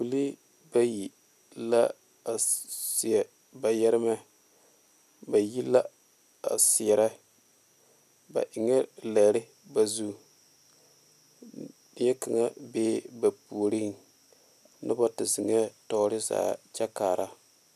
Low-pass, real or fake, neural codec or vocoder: 14.4 kHz; real; none